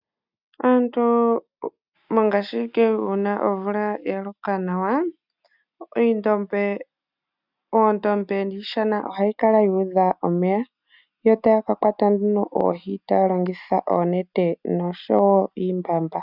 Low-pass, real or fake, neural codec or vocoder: 5.4 kHz; real; none